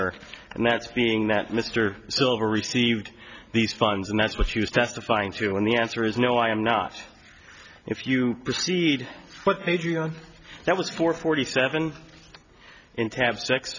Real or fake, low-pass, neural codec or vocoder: real; 7.2 kHz; none